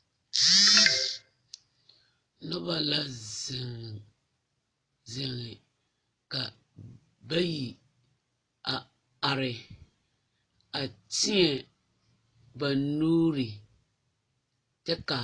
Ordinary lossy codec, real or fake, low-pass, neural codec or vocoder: AAC, 32 kbps; real; 9.9 kHz; none